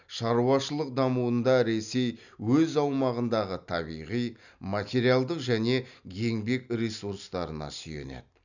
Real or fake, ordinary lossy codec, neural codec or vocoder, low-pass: real; none; none; 7.2 kHz